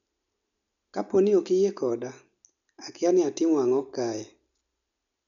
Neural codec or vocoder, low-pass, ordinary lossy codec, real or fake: none; 7.2 kHz; none; real